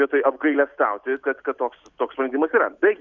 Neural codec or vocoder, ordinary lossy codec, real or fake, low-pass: none; Opus, 64 kbps; real; 7.2 kHz